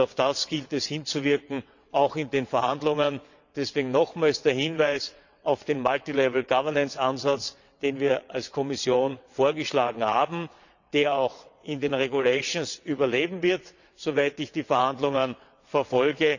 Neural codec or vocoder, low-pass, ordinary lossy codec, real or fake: vocoder, 22.05 kHz, 80 mel bands, WaveNeXt; 7.2 kHz; none; fake